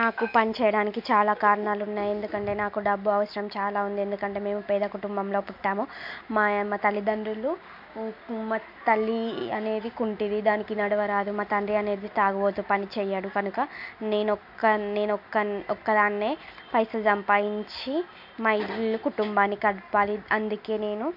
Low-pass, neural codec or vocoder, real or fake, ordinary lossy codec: 5.4 kHz; none; real; MP3, 48 kbps